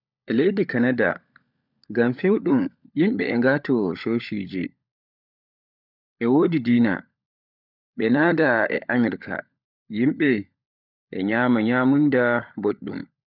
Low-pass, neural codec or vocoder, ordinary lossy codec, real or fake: 5.4 kHz; codec, 16 kHz, 16 kbps, FunCodec, trained on LibriTTS, 50 frames a second; none; fake